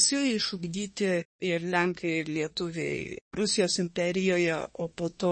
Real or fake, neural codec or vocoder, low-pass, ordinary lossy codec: fake; codec, 24 kHz, 1 kbps, SNAC; 9.9 kHz; MP3, 32 kbps